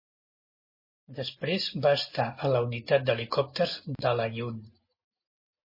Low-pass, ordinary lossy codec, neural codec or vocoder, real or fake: 5.4 kHz; MP3, 24 kbps; none; real